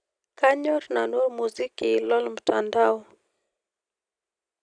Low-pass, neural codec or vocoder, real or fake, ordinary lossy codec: 9.9 kHz; none; real; none